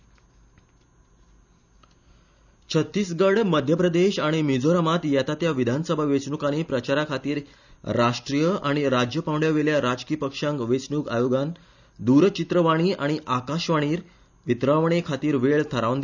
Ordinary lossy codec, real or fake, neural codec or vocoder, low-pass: none; real; none; 7.2 kHz